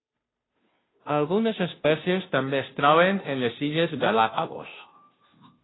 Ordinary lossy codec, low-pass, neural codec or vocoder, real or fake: AAC, 16 kbps; 7.2 kHz; codec, 16 kHz, 0.5 kbps, FunCodec, trained on Chinese and English, 25 frames a second; fake